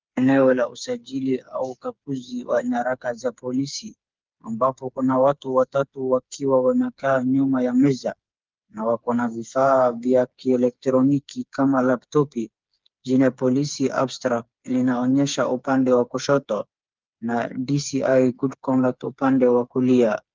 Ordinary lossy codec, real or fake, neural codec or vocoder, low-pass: Opus, 24 kbps; fake; codec, 16 kHz, 4 kbps, FreqCodec, smaller model; 7.2 kHz